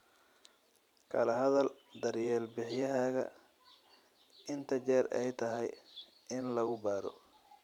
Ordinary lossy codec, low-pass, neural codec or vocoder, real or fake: none; 19.8 kHz; vocoder, 44.1 kHz, 128 mel bands every 512 samples, BigVGAN v2; fake